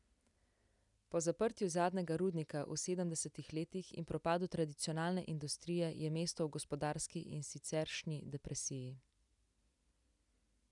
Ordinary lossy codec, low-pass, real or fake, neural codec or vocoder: none; 10.8 kHz; real; none